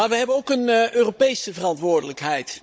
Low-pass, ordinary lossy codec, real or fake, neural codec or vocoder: none; none; fake; codec, 16 kHz, 16 kbps, FunCodec, trained on Chinese and English, 50 frames a second